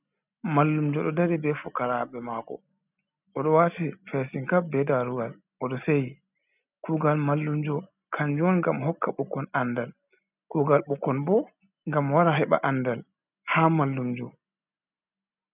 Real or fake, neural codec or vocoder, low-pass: real; none; 3.6 kHz